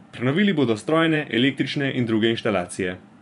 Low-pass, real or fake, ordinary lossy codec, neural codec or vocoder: 10.8 kHz; fake; none; vocoder, 24 kHz, 100 mel bands, Vocos